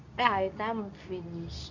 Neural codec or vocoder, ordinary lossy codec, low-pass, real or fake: codec, 24 kHz, 0.9 kbps, WavTokenizer, medium speech release version 2; none; 7.2 kHz; fake